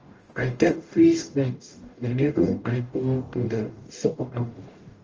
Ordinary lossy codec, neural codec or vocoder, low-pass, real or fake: Opus, 24 kbps; codec, 44.1 kHz, 0.9 kbps, DAC; 7.2 kHz; fake